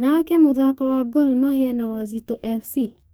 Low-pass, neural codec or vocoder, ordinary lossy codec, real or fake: none; codec, 44.1 kHz, 2.6 kbps, DAC; none; fake